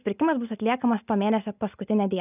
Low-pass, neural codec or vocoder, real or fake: 3.6 kHz; none; real